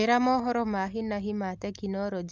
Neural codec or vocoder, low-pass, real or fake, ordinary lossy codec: none; 7.2 kHz; real; Opus, 24 kbps